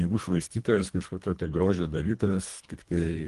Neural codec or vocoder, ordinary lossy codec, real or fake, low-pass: codec, 24 kHz, 1.5 kbps, HILCodec; Opus, 24 kbps; fake; 10.8 kHz